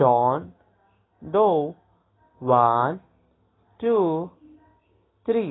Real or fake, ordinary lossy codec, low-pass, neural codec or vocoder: real; AAC, 16 kbps; 7.2 kHz; none